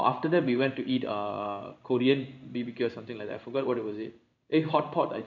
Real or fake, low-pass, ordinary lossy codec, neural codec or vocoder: fake; 7.2 kHz; none; codec, 16 kHz in and 24 kHz out, 1 kbps, XY-Tokenizer